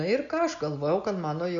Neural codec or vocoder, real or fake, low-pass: none; real; 7.2 kHz